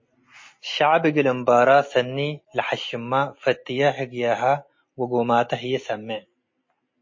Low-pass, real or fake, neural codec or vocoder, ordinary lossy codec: 7.2 kHz; real; none; MP3, 32 kbps